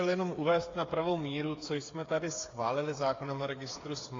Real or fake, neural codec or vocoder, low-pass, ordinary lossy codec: fake; codec, 16 kHz, 8 kbps, FreqCodec, smaller model; 7.2 kHz; AAC, 32 kbps